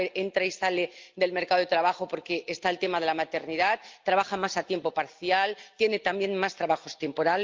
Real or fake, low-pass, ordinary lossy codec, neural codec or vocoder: real; 7.2 kHz; Opus, 24 kbps; none